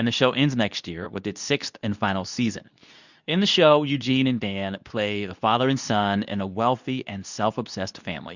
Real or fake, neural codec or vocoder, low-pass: fake; codec, 24 kHz, 0.9 kbps, WavTokenizer, medium speech release version 2; 7.2 kHz